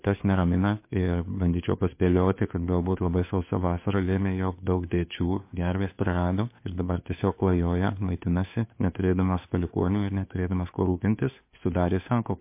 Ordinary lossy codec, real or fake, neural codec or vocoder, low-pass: MP3, 24 kbps; fake; codec, 16 kHz, 2 kbps, FunCodec, trained on Chinese and English, 25 frames a second; 3.6 kHz